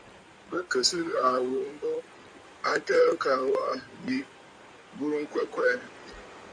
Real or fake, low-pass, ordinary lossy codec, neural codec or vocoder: fake; 9.9 kHz; MP3, 48 kbps; codec, 16 kHz in and 24 kHz out, 2.2 kbps, FireRedTTS-2 codec